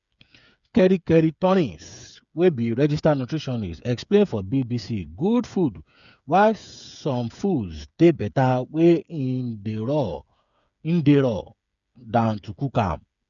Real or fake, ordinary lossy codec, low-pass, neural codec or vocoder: fake; none; 7.2 kHz; codec, 16 kHz, 8 kbps, FreqCodec, smaller model